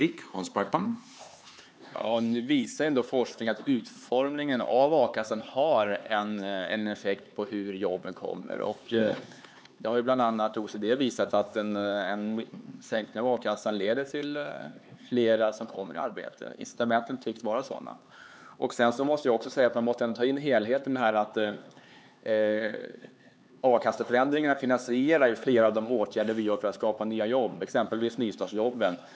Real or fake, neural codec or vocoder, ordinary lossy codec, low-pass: fake; codec, 16 kHz, 4 kbps, X-Codec, HuBERT features, trained on LibriSpeech; none; none